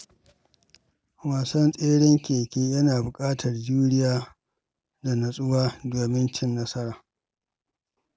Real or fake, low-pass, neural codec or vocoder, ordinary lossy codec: real; none; none; none